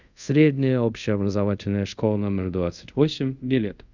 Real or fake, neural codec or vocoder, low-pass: fake; codec, 24 kHz, 0.5 kbps, DualCodec; 7.2 kHz